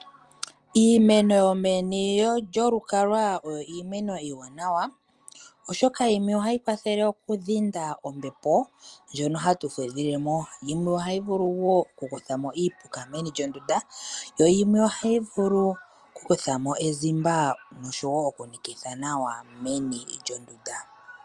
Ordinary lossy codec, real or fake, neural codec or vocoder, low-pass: Opus, 32 kbps; real; none; 10.8 kHz